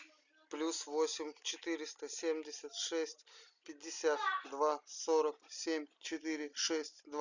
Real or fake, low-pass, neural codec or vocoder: real; 7.2 kHz; none